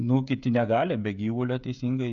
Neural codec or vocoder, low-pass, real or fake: codec, 16 kHz, 16 kbps, FreqCodec, smaller model; 7.2 kHz; fake